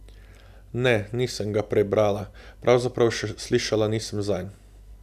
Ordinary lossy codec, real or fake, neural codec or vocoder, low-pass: none; real; none; 14.4 kHz